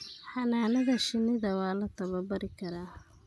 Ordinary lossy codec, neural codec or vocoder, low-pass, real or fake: none; none; none; real